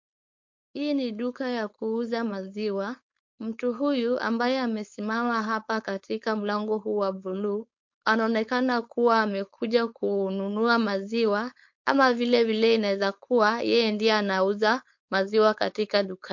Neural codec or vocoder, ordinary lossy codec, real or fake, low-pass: codec, 16 kHz, 4.8 kbps, FACodec; MP3, 48 kbps; fake; 7.2 kHz